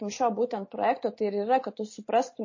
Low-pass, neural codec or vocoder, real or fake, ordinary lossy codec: 7.2 kHz; none; real; MP3, 32 kbps